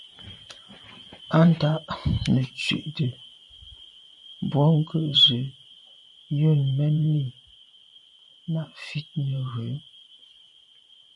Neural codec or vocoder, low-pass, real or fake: vocoder, 44.1 kHz, 128 mel bands every 512 samples, BigVGAN v2; 10.8 kHz; fake